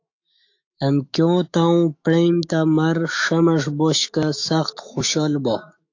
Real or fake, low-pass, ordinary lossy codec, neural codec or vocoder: fake; 7.2 kHz; AAC, 48 kbps; autoencoder, 48 kHz, 128 numbers a frame, DAC-VAE, trained on Japanese speech